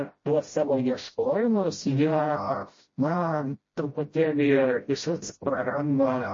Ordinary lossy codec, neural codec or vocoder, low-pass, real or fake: MP3, 32 kbps; codec, 16 kHz, 0.5 kbps, FreqCodec, smaller model; 7.2 kHz; fake